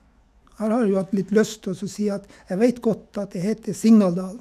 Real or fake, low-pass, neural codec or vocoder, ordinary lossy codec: fake; 14.4 kHz; autoencoder, 48 kHz, 128 numbers a frame, DAC-VAE, trained on Japanese speech; none